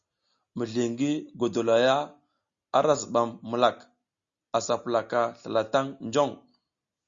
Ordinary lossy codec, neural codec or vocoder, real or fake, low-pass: Opus, 64 kbps; none; real; 7.2 kHz